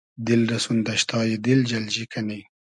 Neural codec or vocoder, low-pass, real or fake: none; 10.8 kHz; real